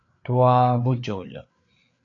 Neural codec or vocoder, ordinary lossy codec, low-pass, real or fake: codec, 16 kHz, 4 kbps, FreqCodec, larger model; AAC, 64 kbps; 7.2 kHz; fake